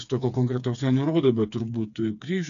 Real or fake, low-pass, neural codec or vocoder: fake; 7.2 kHz; codec, 16 kHz, 4 kbps, FreqCodec, smaller model